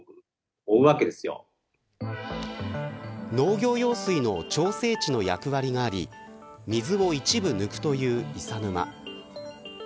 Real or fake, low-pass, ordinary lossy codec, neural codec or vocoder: real; none; none; none